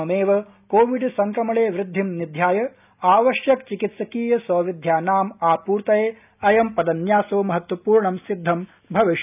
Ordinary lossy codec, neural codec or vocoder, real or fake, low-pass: none; none; real; 3.6 kHz